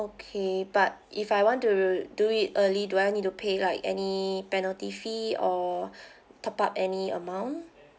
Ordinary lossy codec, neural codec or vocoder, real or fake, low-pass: none; none; real; none